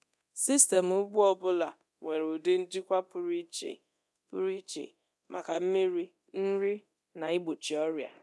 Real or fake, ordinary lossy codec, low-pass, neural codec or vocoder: fake; none; none; codec, 24 kHz, 0.9 kbps, DualCodec